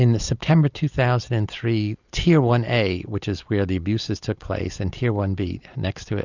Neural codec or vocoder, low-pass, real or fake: none; 7.2 kHz; real